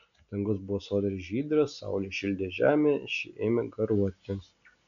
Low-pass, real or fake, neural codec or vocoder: 7.2 kHz; real; none